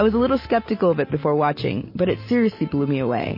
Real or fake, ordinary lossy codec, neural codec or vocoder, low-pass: real; MP3, 24 kbps; none; 5.4 kHz